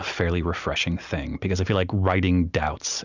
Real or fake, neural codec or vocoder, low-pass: real; none; 7.2 kHz